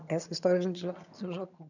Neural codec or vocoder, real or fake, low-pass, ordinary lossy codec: vocoder, 22.05 kHz, 80 mel bands, HiFi-GAN; fake; 7.2 kHz; none